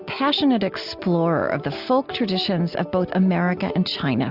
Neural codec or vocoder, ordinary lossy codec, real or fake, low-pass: none; AAC, 48 kbps; real; 5.4 kHz